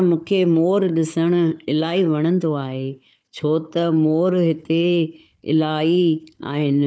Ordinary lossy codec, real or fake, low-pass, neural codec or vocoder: none; fake; none; codec, 16 kHz, 4 kbps, FunCodec, trained on Chinese and English, 50 frames a second